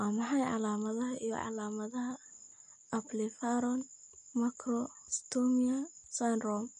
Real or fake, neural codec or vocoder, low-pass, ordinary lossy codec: real; none; 14.4 kHz; MP3, 48 kbps